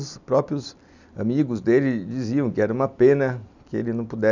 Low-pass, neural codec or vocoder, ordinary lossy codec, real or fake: 7.2 kHz; none; none; real